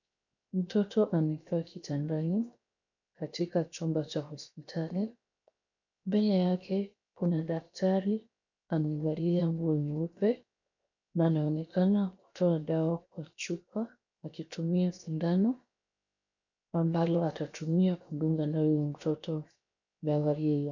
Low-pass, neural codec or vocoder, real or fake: 7.2 kHz; codec, 16 kHz, 0.7 kbps, FocalCodec; fake